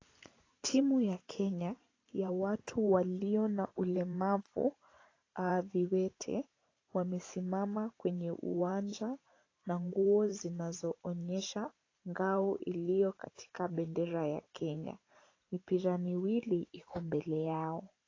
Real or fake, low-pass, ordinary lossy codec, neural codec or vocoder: fake; 7.2 kHz; AAC, 32 kbps; vocoder, 44.1 kHz, 128 mel bands every 512 samples, BigVGAN v2